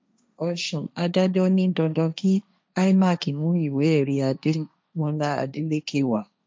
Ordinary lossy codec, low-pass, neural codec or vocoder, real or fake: none; none; codec, 16 kHz, 1.1 kbps, Voila-Tokenizer; fake